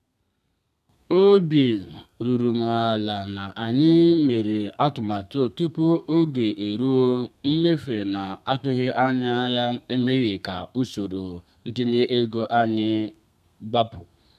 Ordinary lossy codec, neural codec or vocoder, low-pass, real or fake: none; codec, 32 kHz, 1.9 kbps, SNAC; 14.4 kHz; fake